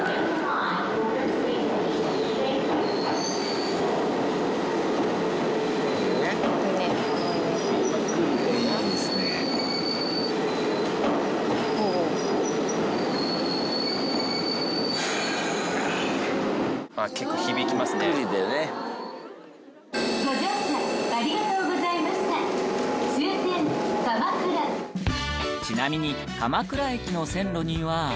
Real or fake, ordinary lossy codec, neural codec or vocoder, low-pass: real; none; none; none